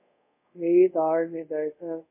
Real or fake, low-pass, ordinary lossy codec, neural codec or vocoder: fake; 3.6 kHz; MP3, 24 kbps; codec, 24 kHz, 0.5 kbps, DualCodec